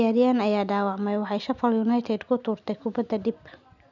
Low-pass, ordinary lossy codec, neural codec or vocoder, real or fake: 7.2 kHz; none; none; real